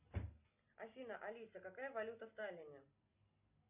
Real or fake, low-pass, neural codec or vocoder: real; 3.6 kHz; none